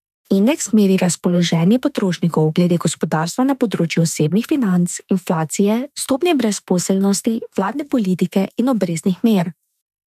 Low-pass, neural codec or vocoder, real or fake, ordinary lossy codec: 14.4 kHz; autoencoder, 48 kHz, 32 numbers a frame, DAC-VAE, trained on Japanese speech; fake; none